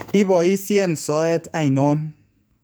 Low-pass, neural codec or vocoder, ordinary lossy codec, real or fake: none; codec, 44.1 kHz, 2.6 kbps, SNAC; none; fake